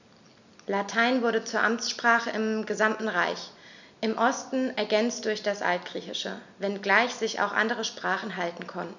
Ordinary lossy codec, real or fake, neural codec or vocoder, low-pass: none; real; none; 7.2 kHz